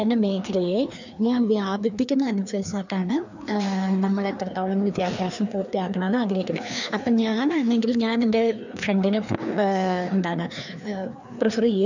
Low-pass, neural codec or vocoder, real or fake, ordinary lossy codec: 7.2 kHz; codec, 16 kHz, 2 kbps, FreqCodec, larger model; fake; none